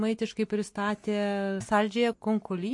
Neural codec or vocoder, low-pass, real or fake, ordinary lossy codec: none; 10.8 kHz; real; MP3, 48 kbps